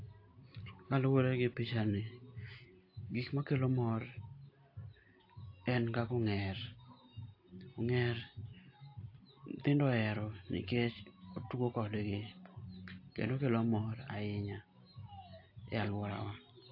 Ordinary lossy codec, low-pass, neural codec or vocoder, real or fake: AAC, 32 kbps; 5.4 kHz; none; real